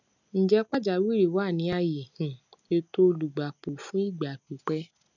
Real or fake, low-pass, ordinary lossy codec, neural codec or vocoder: real; 7.2 kHz; none; none